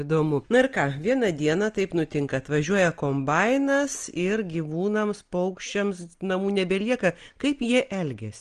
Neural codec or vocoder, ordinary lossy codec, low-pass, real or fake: none; Opus, 24 kbps; 9.9 kHz; real